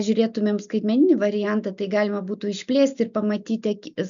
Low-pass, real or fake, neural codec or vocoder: 7.2 kHz; real; none